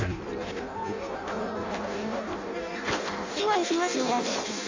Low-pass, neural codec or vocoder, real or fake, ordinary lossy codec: 7.2 kHz; codec, 16 kHz in and 24 kHz out, 0.6 kbps, FireRedTTS-2 codec; fake; AAC, 48 kbps